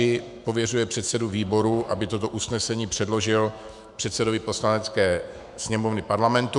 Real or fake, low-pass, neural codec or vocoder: fake; 10.8 kHz; autoencoder, 48 kHz, 128 numbers a frame, DAC-VAE, trained on Japanese speech